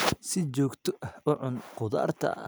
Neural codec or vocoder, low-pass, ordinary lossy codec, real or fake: none; none; none; real